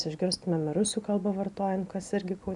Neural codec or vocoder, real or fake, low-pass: none; real; 10.8 kHz